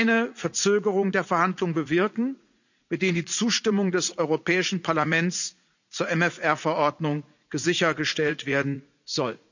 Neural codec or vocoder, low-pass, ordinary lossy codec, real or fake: vocoder, 44.1 kHz, 80 mel bands, Vocos; 7.2 kHz; none; fake